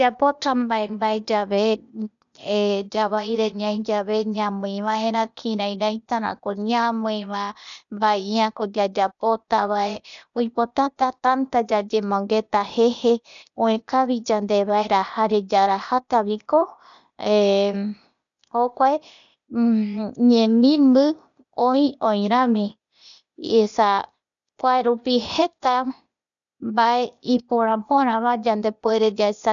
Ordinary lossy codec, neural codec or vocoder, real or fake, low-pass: none; codec, 16 kHz, 0.8 kbps, ZipCodec; fake; 7.2 kHz